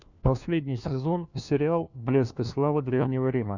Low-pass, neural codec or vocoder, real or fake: 7.2 kHz; codec, 16 kHz, 1 kbps, FunCodec, trained on LibriTTS, 50 frames a second; fake